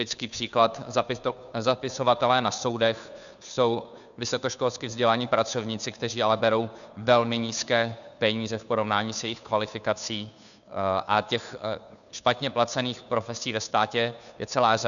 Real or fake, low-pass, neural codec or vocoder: fake; 7.2 kHz; codec, 16 kHz, 2 kbps, FunCodec, trained on Chinese and English, 25 frames a second